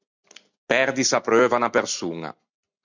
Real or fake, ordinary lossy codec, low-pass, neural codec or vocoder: real; MP3, 64 kbps; 7.2 kHz; none